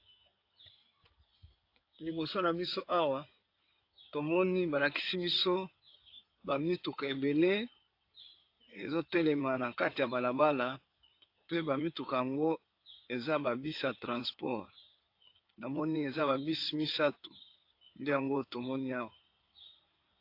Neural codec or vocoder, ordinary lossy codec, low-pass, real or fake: codec, 16 kHz in and 24 kHz out, 2.2 kbps, FireRedTTS-2 codec; AAC, 32 kbps; 5.4 kHz; fake